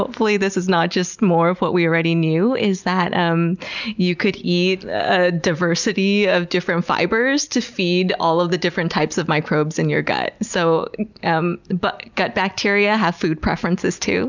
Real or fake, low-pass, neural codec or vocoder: real; 7.2 kHz; none